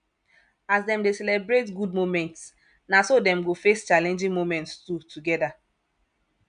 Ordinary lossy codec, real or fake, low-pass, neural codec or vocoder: none; real; 9.9 kHz; none